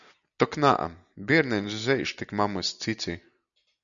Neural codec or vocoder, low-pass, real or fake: none; 7.2 kHz; real